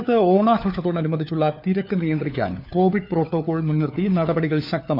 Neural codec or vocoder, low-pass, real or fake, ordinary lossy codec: codec, 16 kHz, 4 kbps, FunCodec, trained on LibriTTS, 50 frames a second; 5.4 kHz; fake; none